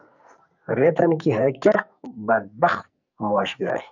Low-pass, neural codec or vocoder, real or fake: 7.2 kHz; codec, 44.1 kHz, 2.6 kbps, SNAC; fake